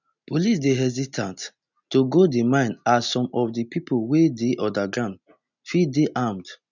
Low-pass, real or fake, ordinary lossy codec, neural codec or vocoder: 7.2 kHz; real; none; none